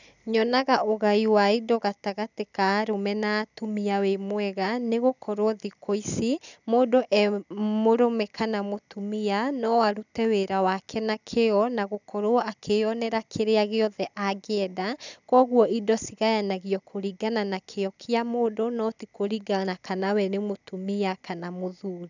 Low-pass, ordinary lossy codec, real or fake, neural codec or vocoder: 7.2 kHz; none; real; none